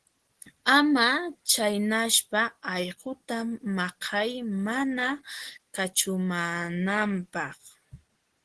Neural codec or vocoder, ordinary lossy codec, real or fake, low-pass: none; Opus, 16 kbps; real; 10.8 kHz